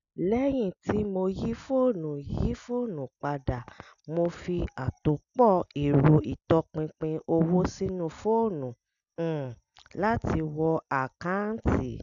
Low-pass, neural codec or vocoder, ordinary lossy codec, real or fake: 7.2 kHz; none; none; real